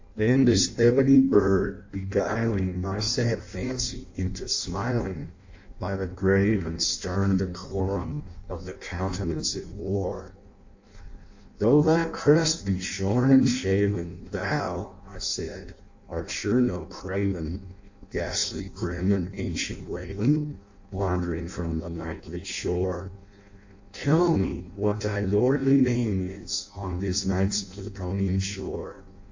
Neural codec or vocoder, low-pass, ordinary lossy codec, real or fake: codec, 16 kHz in and 24 kHz out, 0.6 kbps, FireRedTTS-2 codec; 7.2 kHz; AAC, 48 kbps; fake